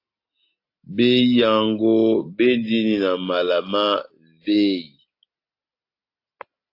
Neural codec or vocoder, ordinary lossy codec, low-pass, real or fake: none; AAC, 32 kbps; 5.4 kHz; real